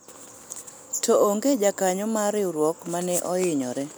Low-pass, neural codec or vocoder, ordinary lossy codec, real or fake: none; none; none; real